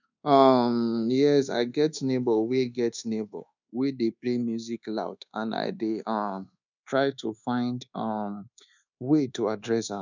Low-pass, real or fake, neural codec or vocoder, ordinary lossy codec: 7.2 kHz; fake; codec, 24 kHz, 1.2 kbps, DualCodec; none